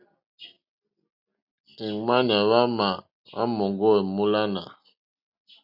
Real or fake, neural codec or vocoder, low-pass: real; none; 5.4 kHz